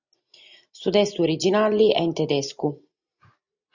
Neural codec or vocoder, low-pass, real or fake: none; 7.2 kHz; real